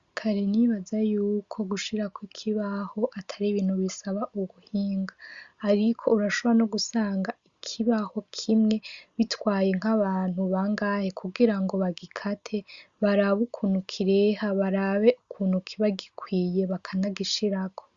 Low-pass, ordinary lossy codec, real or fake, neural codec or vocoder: 7.2 kHz; Opus, 64 kbps; real; none